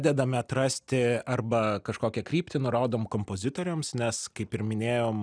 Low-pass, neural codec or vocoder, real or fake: 9.9 kHz; none; real